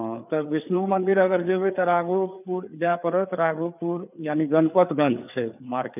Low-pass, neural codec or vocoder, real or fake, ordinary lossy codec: 3.6 kHz; codec, 16 kHz, 4 kbps, FreqCodec, larger model; fake; none